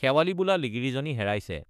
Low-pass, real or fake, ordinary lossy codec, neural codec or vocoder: 14.4 kHz; fake; none; autoencoder, 48 kHz, 32 numbers a frame, DAC-VAE, trained on Japanese speech